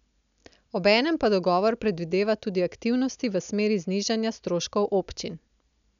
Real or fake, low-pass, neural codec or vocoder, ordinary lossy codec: real; 7.2 kHz; none; none